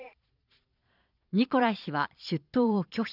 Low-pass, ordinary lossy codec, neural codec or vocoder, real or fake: 5.4 kHz; none; vocoder, 22.05 kHz, 80 mel bands, Vocos; fake